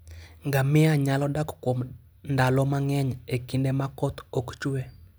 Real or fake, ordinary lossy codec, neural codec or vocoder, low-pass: real; none; none; none